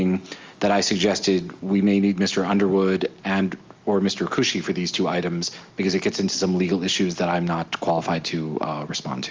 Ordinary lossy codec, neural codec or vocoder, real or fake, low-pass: Opus, 32 kbps; none; real; 7.2 kHz